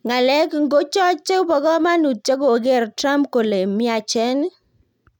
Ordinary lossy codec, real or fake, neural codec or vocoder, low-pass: none; real; none; 19.8 kHz